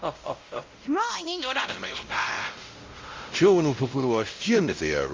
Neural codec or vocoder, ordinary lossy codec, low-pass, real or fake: codec, 16 kHz, 0.5 kbps, X-Codec, WavLM features, trained on Multilingual LibriSpeech; Opus, 32 kbps; 7.2 kHz; fake